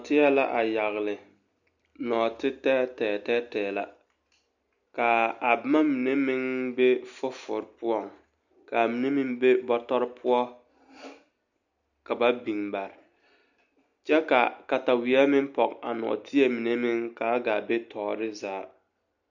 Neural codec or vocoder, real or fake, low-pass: none; real; 7.2 kHz